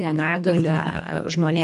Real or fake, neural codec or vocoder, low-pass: fake; codec, 24 kHz, 1.5 kbps, HILCodec; 10.8 kHz